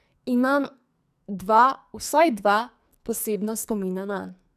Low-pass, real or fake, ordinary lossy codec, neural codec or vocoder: 14.4 kHz; fake; none; codec, 44.1 kHz, 2.6 kbps, SNAC